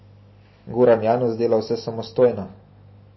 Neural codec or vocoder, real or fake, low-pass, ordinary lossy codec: none; real; 7.2 kHz; MP3, 24 kbps